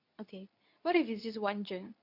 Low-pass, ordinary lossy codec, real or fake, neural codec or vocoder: 5.4 kHz; none; fake; codec, 24 kHz, 0.9 kbps, WavTokenizer, medium speech release version 2